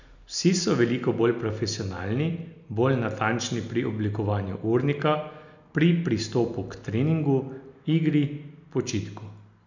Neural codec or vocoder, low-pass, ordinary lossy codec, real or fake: none; 7.2 kHz; none; real